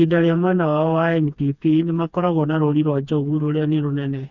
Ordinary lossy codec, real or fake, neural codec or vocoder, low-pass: none; fake; codec, 16 kHz, 2 kbps, FreqCodec, smaller model; 7.2 kHz